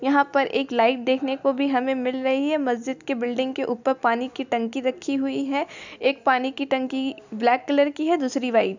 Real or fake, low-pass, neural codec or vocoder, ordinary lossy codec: real; 7.2 kHz; none; none